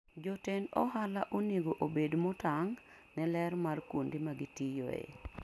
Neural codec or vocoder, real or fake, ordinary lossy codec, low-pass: none; real; none; none